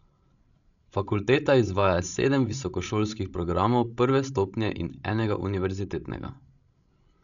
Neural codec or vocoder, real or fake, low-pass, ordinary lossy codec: codec, 16 kHz, 16 kbps, FreqCodec, larger model; fake; 7.2 kHz; none